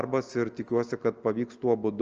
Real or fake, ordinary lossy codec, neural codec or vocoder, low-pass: real; Opus, 24 kbps; none; 7.2 kHz